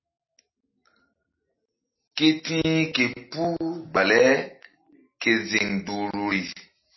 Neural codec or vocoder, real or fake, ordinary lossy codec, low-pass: none; real; MP3, 24 kbps; 7.2 kHz